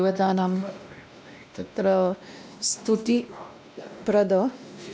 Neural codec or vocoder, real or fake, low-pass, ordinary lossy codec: codec, 16 kHz, 1 kbps, X-Codec, WavLM features, trained on Multilingual LibriSpeech; fake; none; none